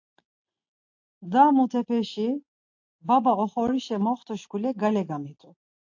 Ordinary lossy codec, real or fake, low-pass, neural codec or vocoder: AAC, 48 kbps; real; 7.2 kHz; none